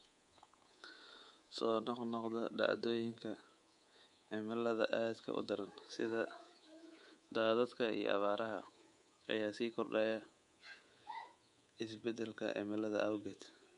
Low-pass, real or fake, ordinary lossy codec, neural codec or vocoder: 10.8 kHz; fake; MP3, 64 kbps; codec, 24 kHz, 3.1 kbps, DualCodec